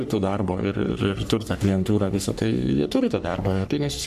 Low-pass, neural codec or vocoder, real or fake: 14.4 kHz; codec, 44.1 kHz, 3.4 kbps, Pupu-Codec; fake